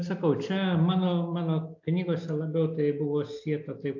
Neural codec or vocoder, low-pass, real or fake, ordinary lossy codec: none; 7.2 kHz; real; AAC, 48 kbps